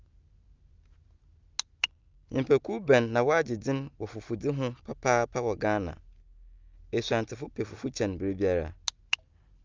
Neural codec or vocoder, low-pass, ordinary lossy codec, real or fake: none; 7.2 kHz; Opus, 24 kbps; real